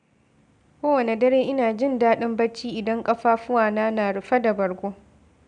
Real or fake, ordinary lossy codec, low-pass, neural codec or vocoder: real; none; 9.9 kHz; none